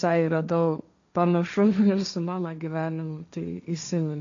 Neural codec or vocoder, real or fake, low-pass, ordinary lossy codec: codec, 16 kHz, 1.1 kbps, Voila-Tokenizer; fake; 7.2 kHz; AAC, 64 kbps